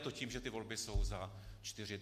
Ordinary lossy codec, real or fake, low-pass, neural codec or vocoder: MP3, 64 kbps; real; 14.4 kHz; none